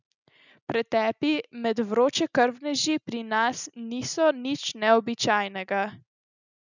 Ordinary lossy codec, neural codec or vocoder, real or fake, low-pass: none; none; real; 7.2 kHz